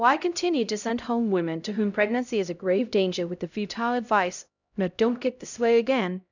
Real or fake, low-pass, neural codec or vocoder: fake; 7.2 kHz; codec, 16 kHz, 0.5 kbps, X-Codec, HuBERT features, trained on LibriSpeech